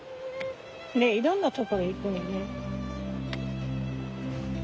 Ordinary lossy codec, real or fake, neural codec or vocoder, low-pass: none; real; none; none